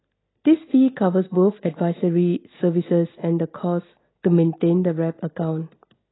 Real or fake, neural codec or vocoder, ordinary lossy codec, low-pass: real; none; AAC, 16 kbps; 7.2 kHz